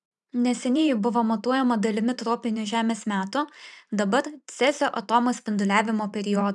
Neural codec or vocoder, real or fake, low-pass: vocoder, 44.1 kHz, 128 mel bands every 512 samples, BigVGAN v2; fake; 10.8 kHz